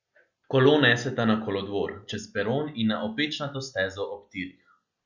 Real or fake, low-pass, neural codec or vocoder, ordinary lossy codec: real; 7.2 kHz; none; Opus, 64 kbps